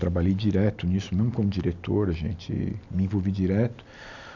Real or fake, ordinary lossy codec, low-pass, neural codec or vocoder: real; none; 7.2 kHz; none